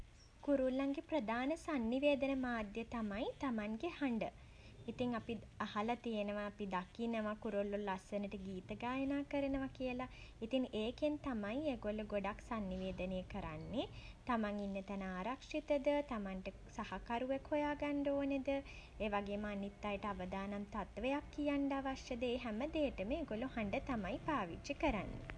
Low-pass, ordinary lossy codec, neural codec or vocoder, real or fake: none; none; none; real